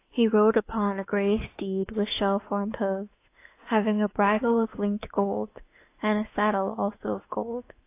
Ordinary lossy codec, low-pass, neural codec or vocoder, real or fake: AAC, 24 kbps; 3.6 kHz; autoencoder, 48 kHz, 32 numbers a frame, DAC-VAE, trained on Japanese speech; fake